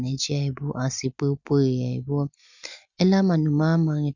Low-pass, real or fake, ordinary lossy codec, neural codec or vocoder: 7.2 kHz; real; none; none